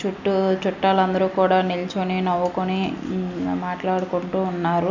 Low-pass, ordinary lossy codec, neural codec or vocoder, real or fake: 7.2 kHz; none; none; real